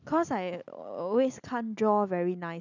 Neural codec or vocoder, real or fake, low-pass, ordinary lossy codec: none; real; 7.2 kHz; none